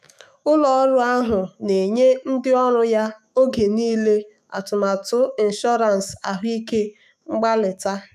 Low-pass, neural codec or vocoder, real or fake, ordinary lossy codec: 14.4 kHz; autoencoder, 48 kHz, 128 numbers a frame, DAC-VAE, trained on Japanese speech; fake; none